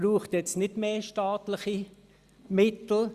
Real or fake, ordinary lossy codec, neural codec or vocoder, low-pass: real; Opus, 64 kbps; none; 14.4 kHz